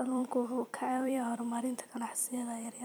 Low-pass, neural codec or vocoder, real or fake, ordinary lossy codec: none; vocoder, 44.1 kHz, 128 mel bands every 256 samples, BigVGAN v2; fake; none